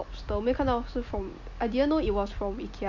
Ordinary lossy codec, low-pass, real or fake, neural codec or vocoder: MP3, 64 kbps; 7.2 kHz; real; none